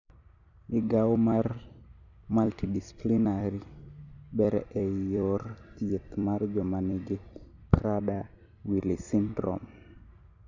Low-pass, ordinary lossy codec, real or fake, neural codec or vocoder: 7.2 kHz; none; fake; vocoder, 44.1 kHz, 128 mel bands every 256 samples, BigVGAN v2